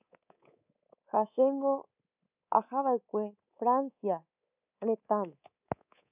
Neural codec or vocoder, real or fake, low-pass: codec, 24 kHz, 3.1 kbps, DualCodec; fake; 3.6 kHz